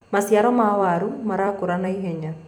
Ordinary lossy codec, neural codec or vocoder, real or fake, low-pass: none; vocoder, 44.1 kHz, 128 mel bands every 256 samples, BigVGAN v2; fake; 19.8 kHz